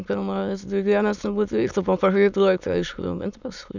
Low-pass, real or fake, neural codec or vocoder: 7.2 kHz; fake; autoencoder, 22.05 kHz, a latent of 192 numbers a frame, VITS, trained on many speakers